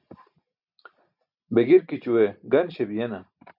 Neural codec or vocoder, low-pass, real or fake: none; 5.4 kHz; real